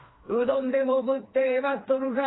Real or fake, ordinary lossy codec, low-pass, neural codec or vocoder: fake; AAC, 16 kbps; 7.2 kHz; codec, 16 kHz, 2 kbps, FreqCodec, smaller model